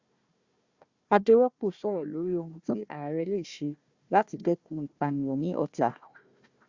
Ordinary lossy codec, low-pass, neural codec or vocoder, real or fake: Opus, 64 kbps; 7.2 kHz; codec, 16 kHz, 1 kbps, FunCodec, trained on Chinese and English, 50 frames a second; fake